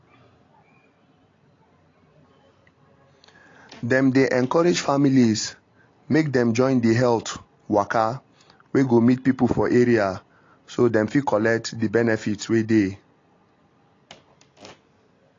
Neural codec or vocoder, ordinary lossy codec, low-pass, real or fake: none; AAC, 32 kbps; 7.2 kHz; real